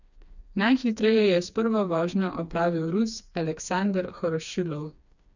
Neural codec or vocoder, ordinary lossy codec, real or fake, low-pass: codec, 16 kHz, 2 kbps, FreqCodec, smaller model; none; fake; 7.2 kHz